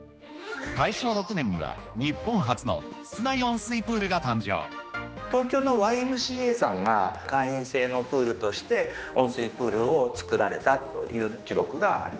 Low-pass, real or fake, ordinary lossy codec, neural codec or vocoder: none; fake; none; codec, 16 kHz, 2 kbps, X-Codec, HuBERT features, trained on general audio